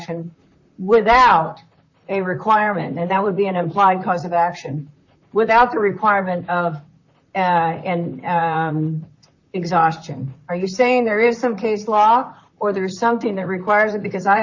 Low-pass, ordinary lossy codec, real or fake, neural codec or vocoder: 7.2 kHz; AAC, 48 kbps; fake; vocoder, 44.1 kHz, 128 mel bands, Pupu-Vocoder